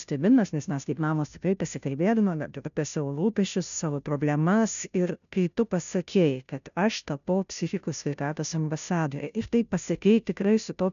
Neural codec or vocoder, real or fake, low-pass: codec, 16 kHz, 0.5 kbps, FunCodec, trained on Chinese and English, 25 frames a second; fake; 7.2 kHz